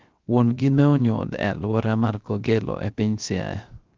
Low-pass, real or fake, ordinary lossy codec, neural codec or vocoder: 7.2 kHz; fake; Opus, 32 kbps; codec, 16 kHz, 0.3 kbps, FocalCodec